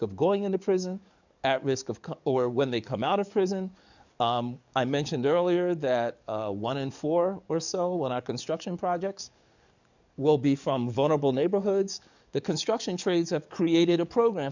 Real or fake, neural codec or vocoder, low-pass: fake; codec, 44.1 kHz, 7.8 kbps, DAC; 7.2 kHz